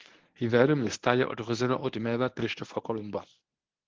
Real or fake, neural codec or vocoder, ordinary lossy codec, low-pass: fake; codec, 24 kHz, 0.9 kbps, WavTokenizer, medium speech release version 1; Opus, 16 kbps; 7.2 kHz